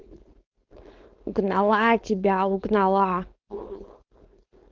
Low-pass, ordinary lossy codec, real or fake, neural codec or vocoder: 7.2 kHz; Opus, 32 kbps; fake; codec, 16 kHz, 4.8 kbps, FACodec